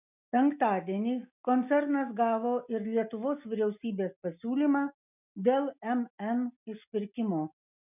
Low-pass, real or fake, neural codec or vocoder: 3.6 kHz; real; none